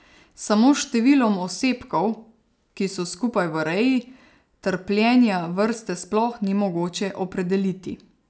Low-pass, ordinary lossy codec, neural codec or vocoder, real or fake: none; none; none; real